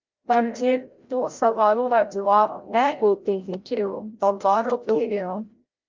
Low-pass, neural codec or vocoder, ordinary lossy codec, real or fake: 7.2 kHz; codec, 16 kHz, 0.5 kbps, FreqCodec, larger model; Opus, 32 kbps; fake